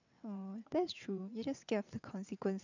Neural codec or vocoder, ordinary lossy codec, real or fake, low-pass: vocoder, 22.05 kHz, 80 mel bands, WaveNeXt; none; fake; 7.2 kHz